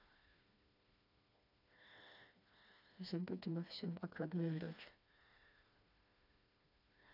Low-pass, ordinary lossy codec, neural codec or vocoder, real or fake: 5.4 kHz; none; codec, 16 kHz, 2 kbps, FreqCodec, smaller model; fake